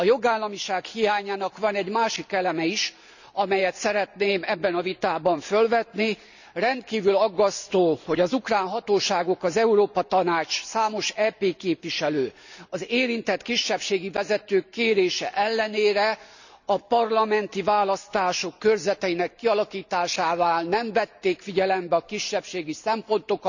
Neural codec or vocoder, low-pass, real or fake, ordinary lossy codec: none; 7.2 kHz; real; none